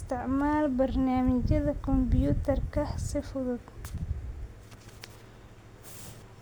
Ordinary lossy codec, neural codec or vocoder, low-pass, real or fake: none; none; none; real